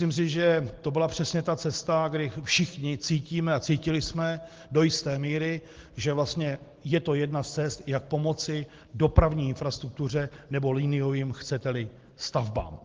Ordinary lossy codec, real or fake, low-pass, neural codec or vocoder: Opus, 16 kbps; real; 7.2 kHz; none